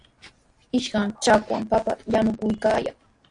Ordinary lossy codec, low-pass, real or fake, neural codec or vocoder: AAC, 48 kbps; 9.9 kHz; real; none